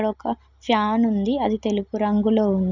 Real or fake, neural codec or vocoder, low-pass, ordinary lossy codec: real; none; 7.2 kHz; none